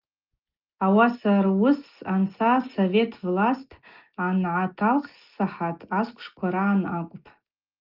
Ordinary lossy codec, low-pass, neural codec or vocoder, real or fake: Opus, 32 kbps; 5.4 kHz; none; real